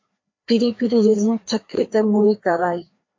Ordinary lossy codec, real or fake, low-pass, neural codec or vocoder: MP3, 48 kbps; fake; 7.2 kHz; codec, 16 kHz, 2 kbps, FreqCodec, larger model